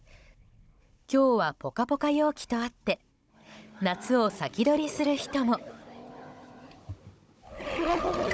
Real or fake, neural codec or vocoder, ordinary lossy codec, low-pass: fake; codec, 16 kHz, 16 kbps, FunCodec, trained on Chinese and English, 50 frames a second; none; none